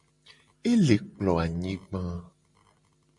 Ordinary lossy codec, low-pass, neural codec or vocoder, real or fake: MP3, 48 kbps; 10.8 kHz; none; real